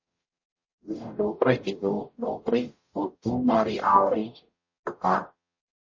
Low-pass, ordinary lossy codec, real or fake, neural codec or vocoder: 7.2 kHz; MP3, 32 kbps; fake; codec, 44.1 kHz, 0.9 kbps, DAC